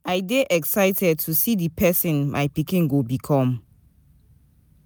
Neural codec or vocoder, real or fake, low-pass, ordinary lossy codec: none; real; none; none